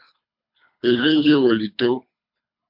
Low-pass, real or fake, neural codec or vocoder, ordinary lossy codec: 5.4 kHz; fake; codec, 24 kHz, 3 kbps, HILCodec; MP3, 48 kbps